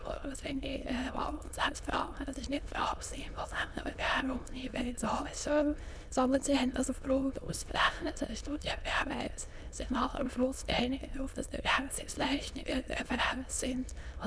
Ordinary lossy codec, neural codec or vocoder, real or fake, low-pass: none; autoencoder, 22.05 kHz, a latent of 192 numbers a frame, VITS, trained on many speakers; fake; none